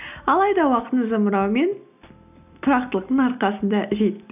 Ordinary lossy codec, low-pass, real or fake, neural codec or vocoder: none; 3.6 kHz; real; none